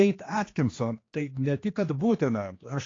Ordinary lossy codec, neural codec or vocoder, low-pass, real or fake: AAC, 32 kbps; codec, 16 kHz, 2 kbps, X-Codec, HuBERT features, trained on general audio; 7.2 kHz; fake